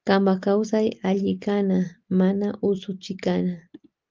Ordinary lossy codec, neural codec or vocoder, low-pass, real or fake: Opus, 24 kbps; none; 7.2 kHz; real